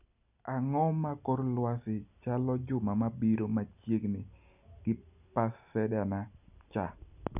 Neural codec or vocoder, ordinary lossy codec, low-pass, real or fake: none; none; 3.6 kHz; real